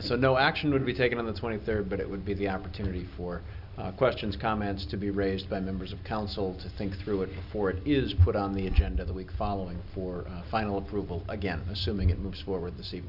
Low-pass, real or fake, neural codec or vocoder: 5.4 kHz; real; none